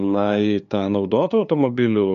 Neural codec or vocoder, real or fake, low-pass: codec, 16 kHz, 2 kbps, FunCodec, trained on LibriTTS, 25 frames a second; fake; 7.2 kHz